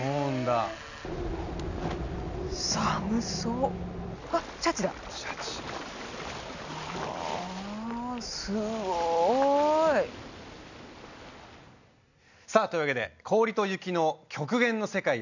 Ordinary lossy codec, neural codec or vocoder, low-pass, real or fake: none; none; 7.2 kHz; real